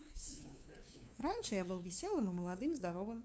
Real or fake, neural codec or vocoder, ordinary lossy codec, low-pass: fake; codec, 16 kHz, 2 kbps, FunCodec, trained on LibriTTS, 25 frames a second; none; none